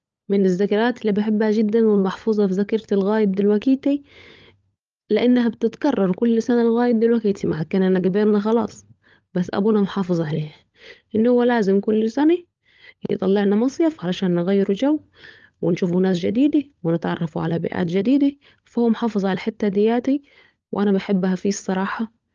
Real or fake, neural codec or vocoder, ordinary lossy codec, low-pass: fake; codec, 16 kHz, 16 kbps, FunCodec, trained on LibriTTS, 50 frames a second; Opus, 24 kbps; 7.2 kHz